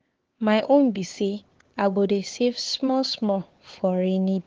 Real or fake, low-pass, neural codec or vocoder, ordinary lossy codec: fake; 7.2 kHz; codec, 16 kHz, 4 kbps, X-Codec, HuBERT features, trained on LibriSpeech; Opus, 16 kbps